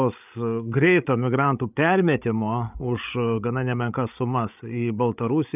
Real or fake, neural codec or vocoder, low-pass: fake; codec, 16 kHz, 8 kbps, FreqCodec, larger model; 3.6 kHz